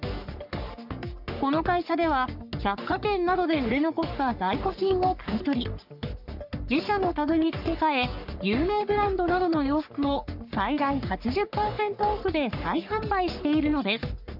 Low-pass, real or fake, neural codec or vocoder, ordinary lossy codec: 5.4 kHz; fake; codec, 44.1 kHz, 3.4 kbps, Pupu-Codec; none